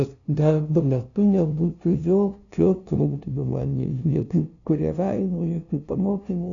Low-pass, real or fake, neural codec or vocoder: 7.2 kHz; fake; codec, 16 kHz, 0.5 kbps, FunCodec, trained on LibriTTS, 25 frames a second